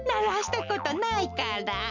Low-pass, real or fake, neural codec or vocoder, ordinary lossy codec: 7.2 kHz; real; none; none